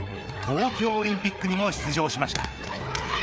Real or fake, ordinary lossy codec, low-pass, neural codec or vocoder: fake; none; none; codec, 16 kHz, 4 kbps, FreqCodec, larger model